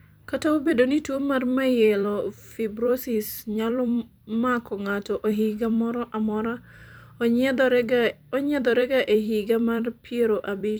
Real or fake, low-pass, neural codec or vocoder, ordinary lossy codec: fake; none; vocoder, 44.1 kHz, 128 mel bands every 256 samples, BigVGAN v2; none